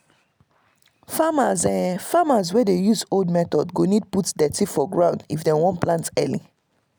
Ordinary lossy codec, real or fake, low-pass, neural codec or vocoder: none; real; none; none